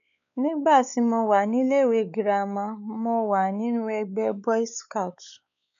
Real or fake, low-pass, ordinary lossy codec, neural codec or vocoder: fake; 7.2 kHz; none; codec, 16 kHz, 4 kbps, X-Codec, WavLM features, trained on Multilingual LibriSpeech